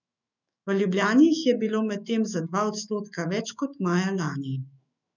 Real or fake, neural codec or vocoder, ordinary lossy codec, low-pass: fake; autoencoder, 48 kHz, 128 numbers a frame, DAC-VAE, trained on Japanese speech; none; 7.2 kHz